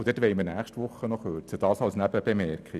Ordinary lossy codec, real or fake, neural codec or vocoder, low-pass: none; real; none; 14.4 kHz